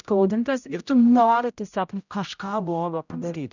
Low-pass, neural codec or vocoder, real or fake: 7.2 kHz; codec, 16 kHz, 0.5 kbps, X-Codec, HuBERT features, trained on general audio; fake